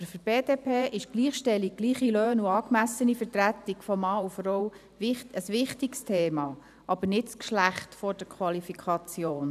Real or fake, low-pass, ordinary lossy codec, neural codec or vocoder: fake; 14.4 kHz; none; vocoder, 44.1 kHz, 128 mel bands every 512 samples, BigVGAN v2